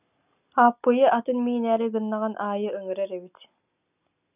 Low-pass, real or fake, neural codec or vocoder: 3.6 kHz; real; none